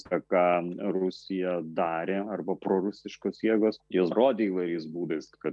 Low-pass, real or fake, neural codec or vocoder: 10.8 kHz; real; none